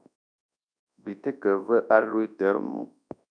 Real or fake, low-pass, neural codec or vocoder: fake; 9.9 kHz; codec, 24 kHz, 0.9 kbps, WavTokenizer, large speech release